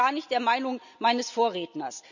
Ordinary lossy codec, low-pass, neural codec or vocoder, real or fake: none; 7.2 kHz; none; real